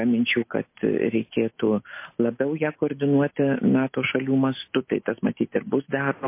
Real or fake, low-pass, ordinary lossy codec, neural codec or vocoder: real; 3.6 kHz; MP3, 24 kbps; none